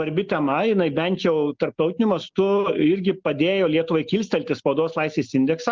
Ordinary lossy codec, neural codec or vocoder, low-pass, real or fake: Opus, 32 kbps; none; 7.2 kHz; real